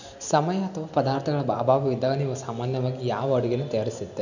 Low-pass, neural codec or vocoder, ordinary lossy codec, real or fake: 7.2 kHz; none; none; real